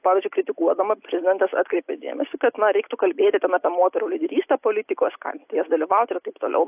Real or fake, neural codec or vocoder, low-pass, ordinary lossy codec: real; none; 3.6 kHz; AAC, 32 kbps